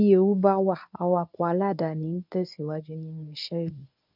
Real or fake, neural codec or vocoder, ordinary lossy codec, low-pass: fake; codec, 24 kHz, 0.9 kbps, WavTokenizer, medium speech release version 1; none; 5.4 kHz